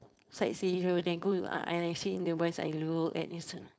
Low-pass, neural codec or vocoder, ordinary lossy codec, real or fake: none; codec, 16 kHz, 4.8 kbps, FACodec; none; fake